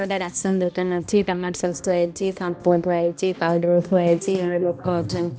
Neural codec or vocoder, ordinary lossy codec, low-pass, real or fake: codec, 16 kHz, 1 kbps, X-Codec, HuBERT features, trained on balanced general audio; none; none; fake